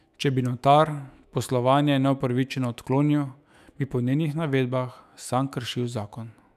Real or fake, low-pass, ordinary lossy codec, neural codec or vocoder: fake; 14.4 kHz; none; autoencoder, 48 kHz, 128 numbers a frame, DAC-VAE, trained on Japanese speech